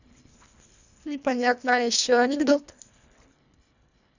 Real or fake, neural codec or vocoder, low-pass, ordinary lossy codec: fake; codec, 24 kHz, 1.5 kbps, HILCodec; 7.2 kHz; none